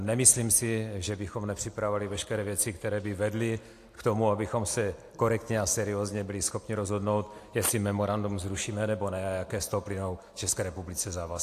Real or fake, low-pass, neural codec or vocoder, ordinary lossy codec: real; 14.4 kHz; none; AAC, 64 kbps